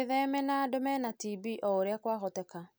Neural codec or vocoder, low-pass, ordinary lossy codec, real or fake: none; none; none; real